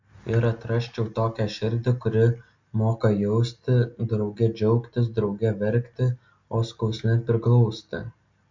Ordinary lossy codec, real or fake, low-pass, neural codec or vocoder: MP3, 64 kbps; real; 7.2 kHz; none